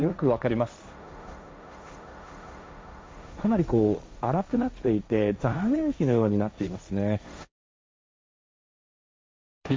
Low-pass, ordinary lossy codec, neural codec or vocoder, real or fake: none; none; codec, 16 kHz, 1.1 kbps, Voila-Tokenizer; fake